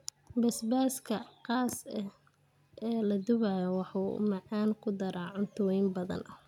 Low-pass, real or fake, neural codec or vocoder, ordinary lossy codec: 19.8 kHz; real; none; none